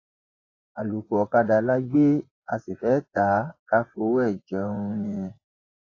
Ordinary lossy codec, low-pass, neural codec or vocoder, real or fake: AAC, 32 kbps; 7.2 kHz; vocoder, 24 kHz, 100 mel bands, Vocos; fake